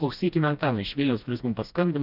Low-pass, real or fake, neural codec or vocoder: 5.4 kHz; fake; codec, 16 kHz, 1 kbps, FreqCodec, smaller model